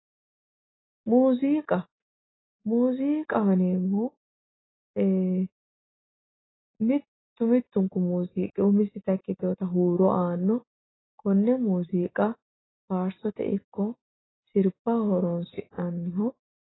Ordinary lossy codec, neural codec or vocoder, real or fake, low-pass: AAC, 16 kbps; none; real; 7.2 kHz